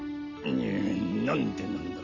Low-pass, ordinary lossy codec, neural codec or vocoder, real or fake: 7.2 kHz; none; none; real